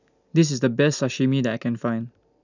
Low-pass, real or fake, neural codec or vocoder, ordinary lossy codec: 7.2 kHz; real; none; none